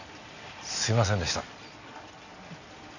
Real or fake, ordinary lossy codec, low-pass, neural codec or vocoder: real; none; 7.2 kHz; none